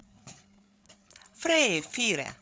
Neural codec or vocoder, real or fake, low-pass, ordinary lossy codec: codec, 16 kHz, 8 kbps, FreqCodec, larger model; fake; none; none